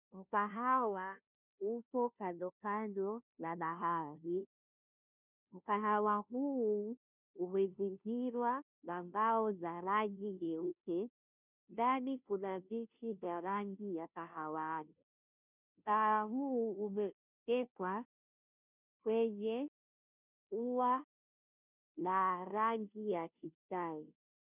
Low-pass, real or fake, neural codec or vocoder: 3.6 kHz; fake; codec, 16 kHz, 0.5 kbps, FunCodec, trained on Chinese and English, 25 frames a second